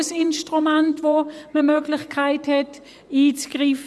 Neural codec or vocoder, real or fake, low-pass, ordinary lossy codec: vocoder, 24 kHz, 100 mel bands, Vocos; fake; none; none